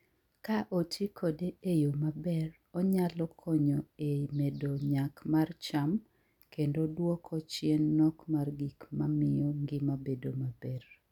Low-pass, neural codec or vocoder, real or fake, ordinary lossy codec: 19.8 kHz; none; real; none